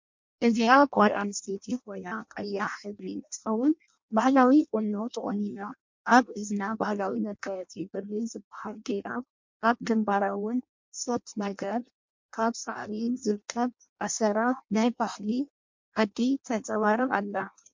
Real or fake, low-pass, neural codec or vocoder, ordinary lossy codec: fake; 7.2 kHz; codec, 16 kHz in and 24 kHz out, 0.6 kbps, FireRedTTS-2 codec; MP3, 48 kbps